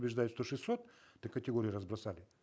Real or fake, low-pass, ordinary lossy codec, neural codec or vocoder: real; none; none; none